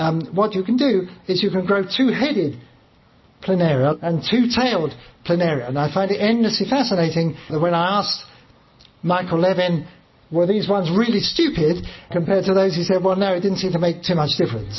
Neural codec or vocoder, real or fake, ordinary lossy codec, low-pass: none; real; MP3, 24 kbps; 7.2 kHz